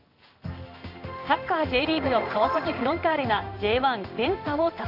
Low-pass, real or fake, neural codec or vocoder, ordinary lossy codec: 5.4 kHz; fake; codec, 16 kHz in and 24 kHz out, 1 kbps, XY-Tokenizer; none